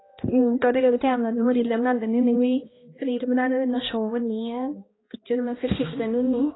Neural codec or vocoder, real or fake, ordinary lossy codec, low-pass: codec, 16 kHz, 1 kbps, X-Codec, HuBERT features, trained on balanced general audio; fake; AAC, 16 kbps; 7.2 kHz